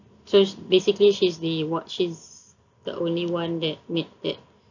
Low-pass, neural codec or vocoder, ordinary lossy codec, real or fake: 7.2 kHz; none; none; real